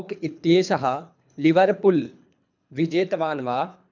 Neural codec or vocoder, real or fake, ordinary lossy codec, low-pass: codec, 24 kHz, 3 kbps, HILCodec; fake; none; 7.2 kHz